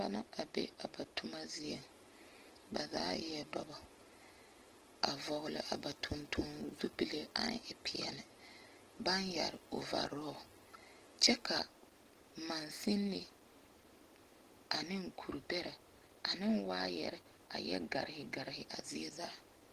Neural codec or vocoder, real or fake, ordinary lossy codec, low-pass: none; real; Opus, 16 kbps; 14.4 kHz